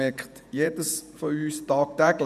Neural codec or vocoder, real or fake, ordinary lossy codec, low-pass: vocoder, 48 kHz, 128 mel bands, Vocos; fake; none; 14.4 kHz